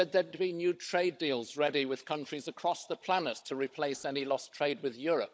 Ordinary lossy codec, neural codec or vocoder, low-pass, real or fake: none; codec, 16 kHz, 16 kbps, FunCodec, trained on Chinese and English, 50 frames a second; none; fake